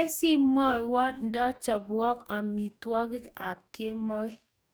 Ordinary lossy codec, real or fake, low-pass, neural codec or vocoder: none; fake; none; codec, 44.1 kHz, 2.6 kbps, DAC